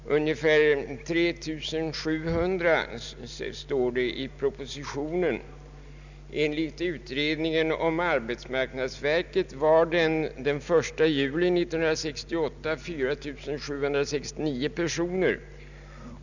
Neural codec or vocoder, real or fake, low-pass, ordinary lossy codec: none; real; 7.2 kHz; none